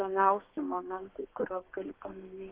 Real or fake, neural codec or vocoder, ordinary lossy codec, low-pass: fake; codec, 32 kHz, 1.9 kbps, SNAC; Opus, 32 kbps; 3.6 kHz